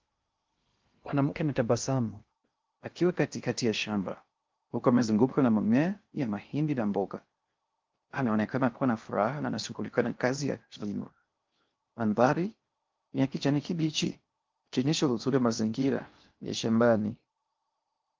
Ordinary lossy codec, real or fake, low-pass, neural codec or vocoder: Opus, 32 kbps; fake; 7.2 kHz; codec, 16 kHz in and 24 kHz out, 0.6 kbps, FocalCodec, streaming, 2048 codes